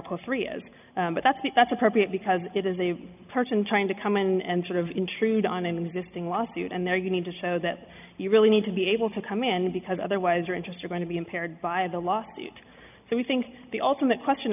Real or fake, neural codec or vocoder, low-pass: real; none; 3.6 kHz